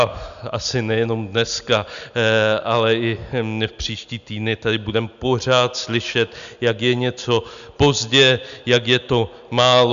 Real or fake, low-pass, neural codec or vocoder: real; 7.2 kHz; none